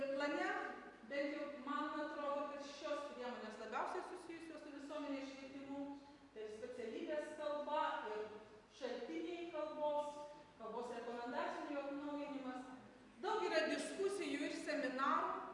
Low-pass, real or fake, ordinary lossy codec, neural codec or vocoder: 10.8 kHz; fake; MP3, 64 kbps; vocoder, 44.1 kHz, 128 mel bands every 256 samples, BigVGAN v2